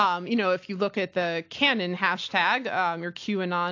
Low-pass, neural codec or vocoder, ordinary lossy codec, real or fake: 7.2 kHz; none; AAC, 48 kbps; real